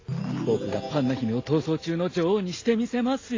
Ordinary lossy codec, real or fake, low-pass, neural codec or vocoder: AAC, 32 kbps; real; 7.2 kHz; none